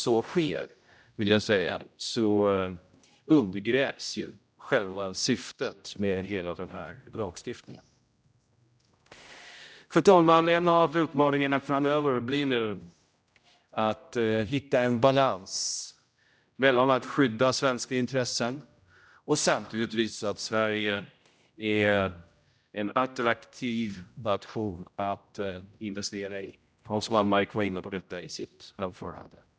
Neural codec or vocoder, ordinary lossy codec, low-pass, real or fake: codec, 16 kHz, 0.5 kbps, X-Codec, HuBERT features, trained on general audio; none; none; fake